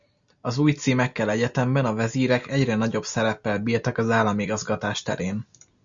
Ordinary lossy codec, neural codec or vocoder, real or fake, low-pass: Opus, 64 kbps; none; real; 7.2 kHz